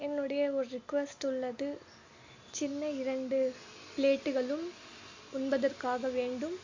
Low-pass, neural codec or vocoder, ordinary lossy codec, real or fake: 7.2 kHz; none; AAC, 48 kbps; real